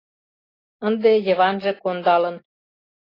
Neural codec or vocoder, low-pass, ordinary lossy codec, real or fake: none; 5.4 kHz; AAC, 24 kbps; real